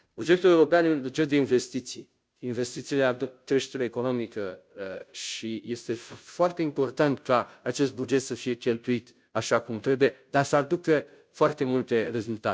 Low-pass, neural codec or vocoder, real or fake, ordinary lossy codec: none; codec, 16 kHz, 0.5 kbps, FunCodec, trained on Chinese and English, 25 frames a second; fake; none